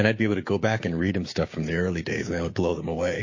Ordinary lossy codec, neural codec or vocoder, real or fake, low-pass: MP3, 32 kbps; codec, 16 kHz, 6 kbps, DAC; fake; 7.2 kHz